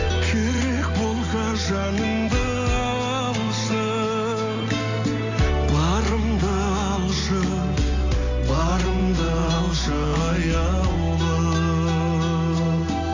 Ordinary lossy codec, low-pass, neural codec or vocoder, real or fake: AAC, 48 kbps; 7.2 kHz; none; real